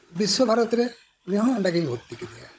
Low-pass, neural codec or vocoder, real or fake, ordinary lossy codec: none; codec, 16 kHz, 16 kbps, FunCodec, trained on Chinese and English, 50 frames a second; fake; none